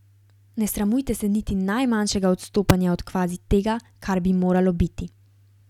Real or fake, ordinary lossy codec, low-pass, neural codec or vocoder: real; none; 19.8 kHz; none